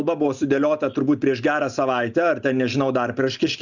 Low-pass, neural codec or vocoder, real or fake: 7.2 kHz; none; real